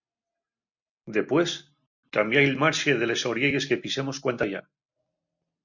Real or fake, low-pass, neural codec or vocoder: real; 7.2 kHz; none